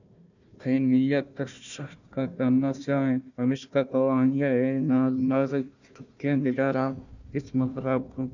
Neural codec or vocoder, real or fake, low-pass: codec, 16 kHz, 1 kbps, FunCodec, trained on Chinese and English, 50 frames a second; fake; 7.2 kHz